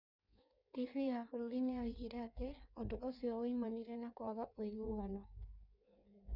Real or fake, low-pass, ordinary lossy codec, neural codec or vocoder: fake; 5.4 kHz; none; codec, 16 kHz in and 24 kHz out, 1.1 kbps, FireRedTTS-2 codec